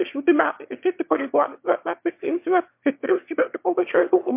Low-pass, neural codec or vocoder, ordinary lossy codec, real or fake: 3.6 kHz; autoencoder, 22.05 kHz, a latent of 192 numbers a frame, VITS, trained on one speaker; MP3, 32 kbps; fake